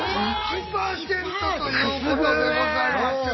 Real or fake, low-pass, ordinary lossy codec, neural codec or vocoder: real; 7.2 kHz; MP3, 24 kbps; none